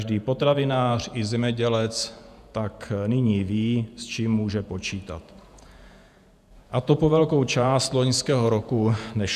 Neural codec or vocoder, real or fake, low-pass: vocoder, 48 kHz, 128 mel bands, Vocos; fake; 14.4 kHz